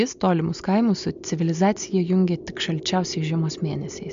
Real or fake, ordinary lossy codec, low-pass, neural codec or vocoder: real; MP3, 64 kbps; 7.2 kHz; none